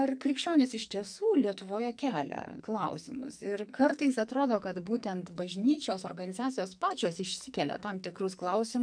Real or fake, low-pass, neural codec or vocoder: fake; 9.9 kHz; codec, 44.1 kHz, 2.6 kbps, SNAC